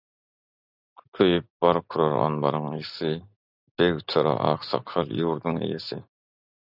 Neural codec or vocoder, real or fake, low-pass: none; real; 5.4 kHz